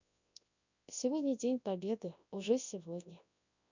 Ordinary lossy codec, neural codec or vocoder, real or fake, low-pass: none; codec, 24 kHz, 0.9 kbps, WavTokenizer, large speech release; fake; 7.2 kHz